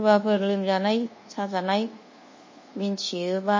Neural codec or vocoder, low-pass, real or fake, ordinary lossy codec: codec, 24 kHz, 1.2 kbps, DualCodec; 7.2 kHz; fake; MP3, 32 kbps